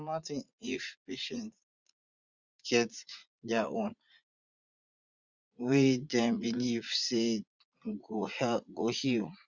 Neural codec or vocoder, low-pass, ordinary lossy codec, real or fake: vocoder, 44.1 kHz, 80 mel bands, Vocos; 7.2 kHz; Opus, 64 kbps; fake